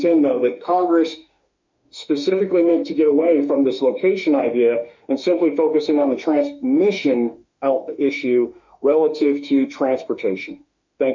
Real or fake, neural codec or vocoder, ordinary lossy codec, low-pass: fake; autoencoder, 48 kHz, 32 numbers a frame, DAC-VAE, trained on Japanese speech; MP3, 64 kbps; 7.2 kHz